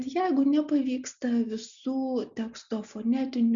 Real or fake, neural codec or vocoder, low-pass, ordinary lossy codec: real; none; 7.2 kHz; Opus, 64 kbps